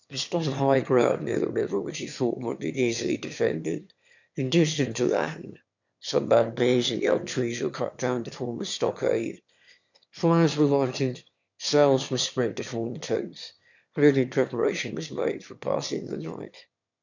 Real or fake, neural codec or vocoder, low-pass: fake; autoencoder, 22.05 kHz, a latent of 192 numbers a frame, VITS, trained on one speaker; 7.2 kHz